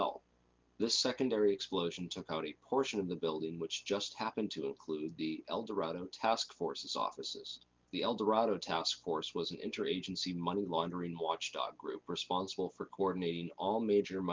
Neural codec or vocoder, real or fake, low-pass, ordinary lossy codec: none; real; 7.2 kHz; Opus, 32 kbps